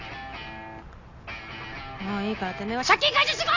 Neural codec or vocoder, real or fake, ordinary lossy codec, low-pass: none; real; none; 7.2 kHz